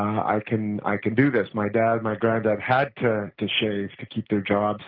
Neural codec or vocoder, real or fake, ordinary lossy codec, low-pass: none; real; Opus, 32 kbps; 5.4 kHz